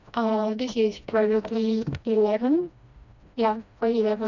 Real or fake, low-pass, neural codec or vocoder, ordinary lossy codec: fake; 7.2 kHz; codec, 16 kHz, 1 kbps, FreqCodec, smaller model; none